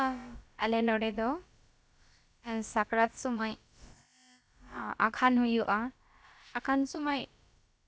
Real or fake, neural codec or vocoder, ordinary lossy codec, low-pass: fake; codec, 16 kHz, about 1 kbps, DyCAST, with the encoder's durations; none; none